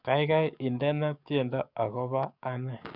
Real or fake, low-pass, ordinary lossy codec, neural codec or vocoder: fake; 5.4 kHz; none; codec, 44.1 kHz, 7.8 kbps, DAC